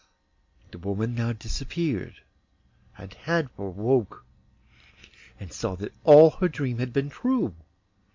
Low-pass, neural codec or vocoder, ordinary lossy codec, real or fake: 7.2 kHz; none; MP3, 48 kbps; real